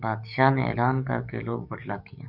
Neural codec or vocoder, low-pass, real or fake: codec, 44.1 kHz, 7.8 kbps, DAC; 5.4 kHz; fake